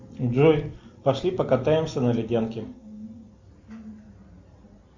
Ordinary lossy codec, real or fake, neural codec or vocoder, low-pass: MP3, 64 kbps; real; none; 7.2 kHz